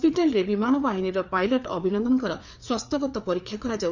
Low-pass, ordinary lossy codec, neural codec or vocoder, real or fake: 7.2 kHz; none; codec, 16 kHz, 4 kbps, FreqCodec, larger model; fake